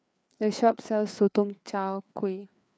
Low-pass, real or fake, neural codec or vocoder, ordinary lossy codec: none; fake; codec, 16 kHz, 4 kbps, FreqCodec, larger model; none